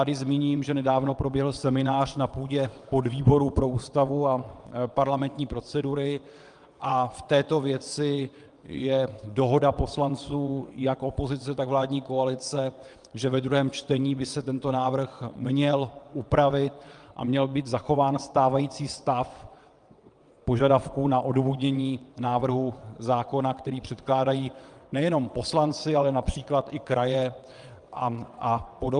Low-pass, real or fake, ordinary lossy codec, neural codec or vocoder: 9.9 kHz; fake; Opus, 32 kbps; vocoder, 22.05 kHz, 80 mel bands, WaveNeXt